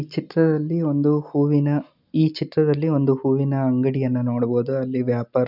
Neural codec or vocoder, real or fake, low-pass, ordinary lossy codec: none; real; 5.4 kHz; none